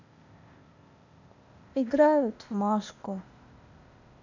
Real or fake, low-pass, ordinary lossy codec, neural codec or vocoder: fake; 7.2 kHz; none; codec, 16 kHz, 0.8 kbps, ZipCodec